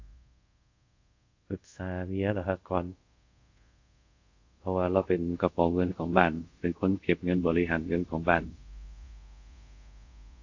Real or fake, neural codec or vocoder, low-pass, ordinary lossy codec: fake; codec, 24 kHz, 0.5 kbps, DualCodec; 7.2 kHz; AAC, 48 kbps